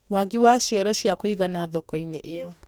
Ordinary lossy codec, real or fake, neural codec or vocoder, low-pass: none; fake; codec, 44.1 kHz, 2.6 kbps, DAC; none